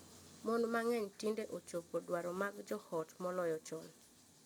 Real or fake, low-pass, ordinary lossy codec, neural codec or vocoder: real; none; none; none